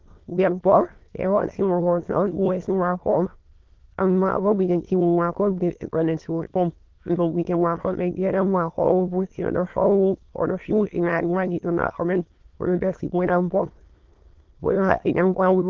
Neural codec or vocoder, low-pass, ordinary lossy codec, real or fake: autoencoder, 22.05 kHz, a latent of 192 numbers a frame, VITS, trained on many speakers; 7.2 kHz; Opus, 16 kbps; fake